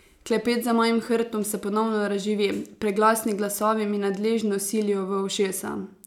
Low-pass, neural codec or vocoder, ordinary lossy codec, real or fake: 19.8 kHz; none; none; real